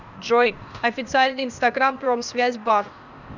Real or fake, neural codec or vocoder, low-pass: fake; codec, 16 kHz, 0.8 kbps, ZipCodec; 7.2 kHz